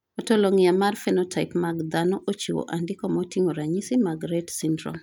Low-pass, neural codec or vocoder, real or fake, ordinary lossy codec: 19.8 kHz; none; real; none